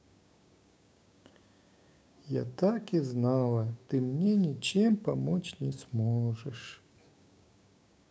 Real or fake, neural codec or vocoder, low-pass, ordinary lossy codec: fake; codec, 16 kHz, 6 kbps, DAC; none; none